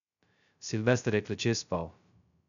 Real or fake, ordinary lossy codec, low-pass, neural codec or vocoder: fake; none; 7.2 kHz; codec, 16 kHz, 0.2 kbps, FocalCodec